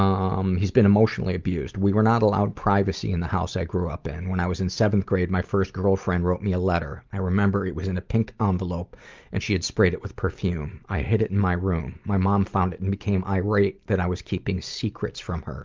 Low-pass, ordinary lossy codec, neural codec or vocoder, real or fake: 7.2 kHz; Opus, 32 kbps; none; real